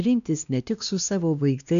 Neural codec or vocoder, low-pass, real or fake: codec, 16 kHz, 1 kbps, X-Codec, WavLM features, trained on Multilingual LibriSpeech; 7.2 kHz; fake